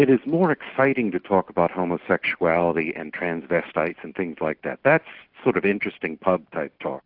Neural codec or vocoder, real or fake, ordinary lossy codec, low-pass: none; real; AAC, 48 kbps; 5.4 kHz